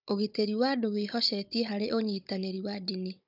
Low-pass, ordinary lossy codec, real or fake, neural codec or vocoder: 5.4 kHz; none; fake; codec, 16 kHz, 16 kbps, FunCodec, trained on Chinese and English, 50 frames a second